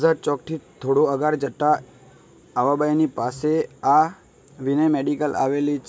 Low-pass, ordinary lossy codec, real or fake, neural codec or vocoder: none; none; real; none